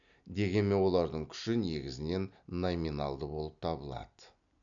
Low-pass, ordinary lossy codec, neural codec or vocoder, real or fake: 7.2 kHz; none; none; real